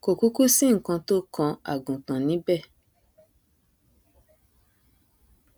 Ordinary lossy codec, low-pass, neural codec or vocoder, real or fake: none; none; none; real